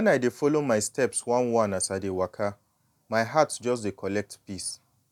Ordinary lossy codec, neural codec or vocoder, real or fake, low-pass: none; none; real; 19.8 kHz